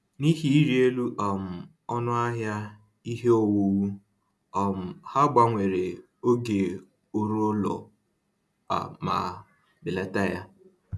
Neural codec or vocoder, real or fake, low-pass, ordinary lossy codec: none; real; none; none